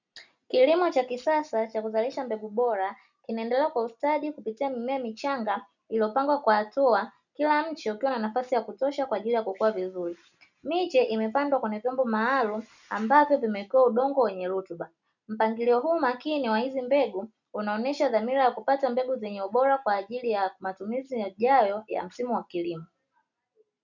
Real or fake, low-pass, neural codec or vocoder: real; 7.2 kHz; none